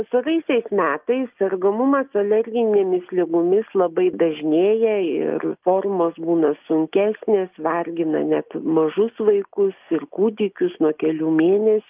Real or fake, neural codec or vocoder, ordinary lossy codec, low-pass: real; none; Opus, 24 kbps; 3.6 kHz